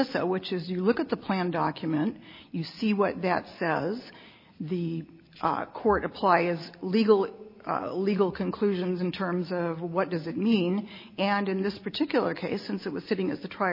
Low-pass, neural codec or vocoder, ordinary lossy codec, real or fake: 5.4 kHz; none; MP3, 24 kbps; real